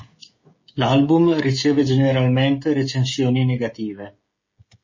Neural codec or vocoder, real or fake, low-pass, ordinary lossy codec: codec, 16 kHz, 16 kbps, FreqCodec, smaller model; fake; 7.2 kHz; MP3, 32 kbps